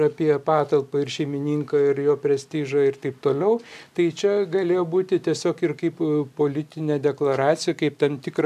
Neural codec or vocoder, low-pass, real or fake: none; 14.4 kHz; real